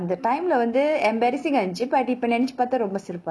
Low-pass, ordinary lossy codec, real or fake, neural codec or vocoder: none; none; real; none